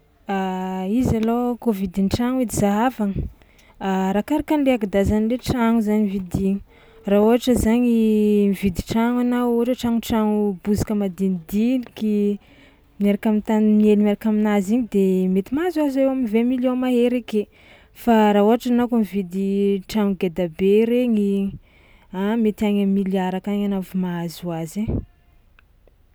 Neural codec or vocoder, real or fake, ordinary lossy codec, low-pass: none; real; none; none